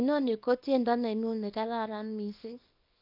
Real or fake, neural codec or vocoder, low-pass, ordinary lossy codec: fake; codec, 24 kHz, 0.9 kbps, WavTokenizer, small release; 5.4 kHz; AAC, 48 kbps